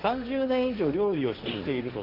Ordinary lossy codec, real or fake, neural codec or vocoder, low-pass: none; fake; codec, 16 kHz, 1.1 kbps, Voila-Tokenizer; 5.4 kHz